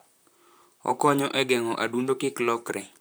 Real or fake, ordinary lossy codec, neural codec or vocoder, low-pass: fake; none; vocoder, 44.1 kHz, 128 mel bands, Pupu-Vocoder; none